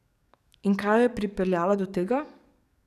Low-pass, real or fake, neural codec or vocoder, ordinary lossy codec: 14.4 kHz; fake; codec, 44.1 kHz, 7.8 kbps, DAC; none